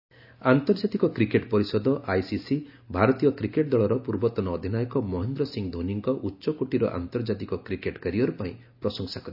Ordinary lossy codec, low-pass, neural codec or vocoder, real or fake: none; 5.4 kHz; none; real